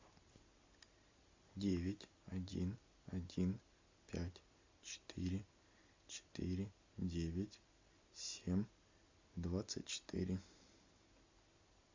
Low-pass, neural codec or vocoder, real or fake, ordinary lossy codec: 7.2 kHz; none; real; MP3, 64 kbps